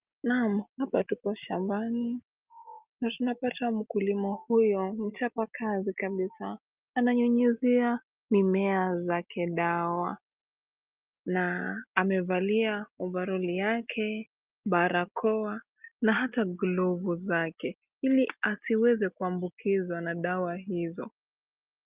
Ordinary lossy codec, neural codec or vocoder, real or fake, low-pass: Opus, 24 kbps; none; real; 3.6 kHz